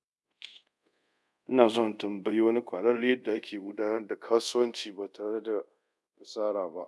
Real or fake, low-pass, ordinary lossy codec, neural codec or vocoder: fake; none; none; codec, 24 kHz, 0.5 kbps, DualCodec